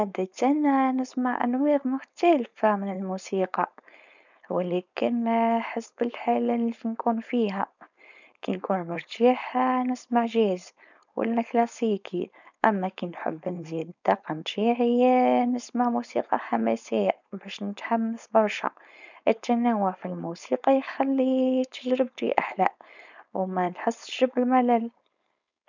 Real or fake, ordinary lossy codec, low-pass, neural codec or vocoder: fake; none; 7.2 kHz; codec, 16 kHz, 4.8 kbps, FACodec